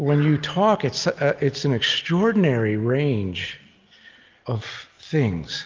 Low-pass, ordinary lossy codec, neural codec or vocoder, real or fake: 7.2 kHz; Opus, 32 kbps; none; real